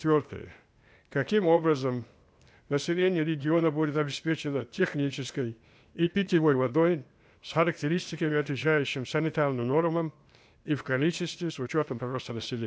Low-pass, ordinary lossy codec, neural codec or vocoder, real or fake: none; none; codec, 16 kHz, 0.8 kbps, ZipCodec; fake